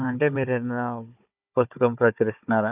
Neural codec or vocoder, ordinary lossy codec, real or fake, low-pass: codec, 16 kHz, 16 kbps, FunCodec, trained on Chinese and English, 50 frames a second; none; fake; 3.6 kHz